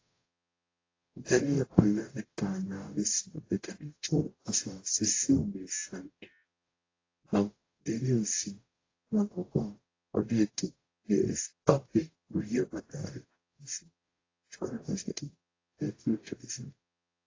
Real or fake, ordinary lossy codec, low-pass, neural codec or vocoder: fake; AAC, 32 kbps; 7.2 kHz; codec, 44.1 kHz, 0.9 kbps, DAC